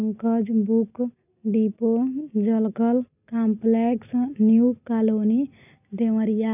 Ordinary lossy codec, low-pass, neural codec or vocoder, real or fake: none; 3.6 kHz; none; real